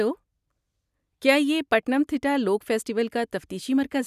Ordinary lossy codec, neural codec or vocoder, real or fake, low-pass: none; none; real; 14.4 kHz